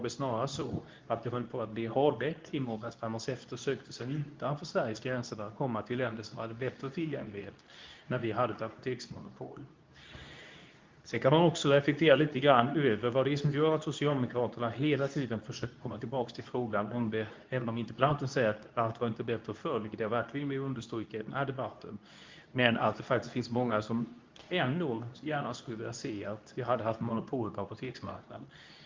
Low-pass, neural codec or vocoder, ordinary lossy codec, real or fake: 7.2 kHz; codec, 24 kHz, 0.9 kbps, WavTokenizer, medium speech release version 2; Opus, 24 kbps; fake